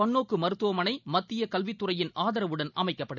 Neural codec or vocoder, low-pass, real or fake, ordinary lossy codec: none; 7.2 kHz; real; none